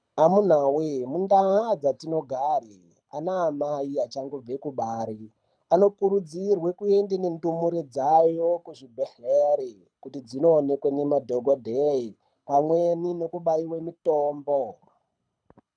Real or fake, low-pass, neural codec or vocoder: fake; 9.9 kHz; codec, 24 kHz, 6 kbps, HILCodec